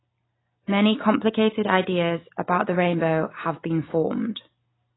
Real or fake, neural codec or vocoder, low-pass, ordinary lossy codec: real; none; 7.2 kHz; AAC, 16 kbps